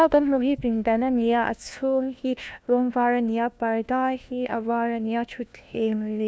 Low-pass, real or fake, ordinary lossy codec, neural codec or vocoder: none; fake; none; codec, 16 kHz, 1 kbps, FunCodec, trained on LibriTTS, 50 frames a second